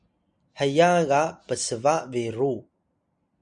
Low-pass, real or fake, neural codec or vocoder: 9.9 kHz; real; none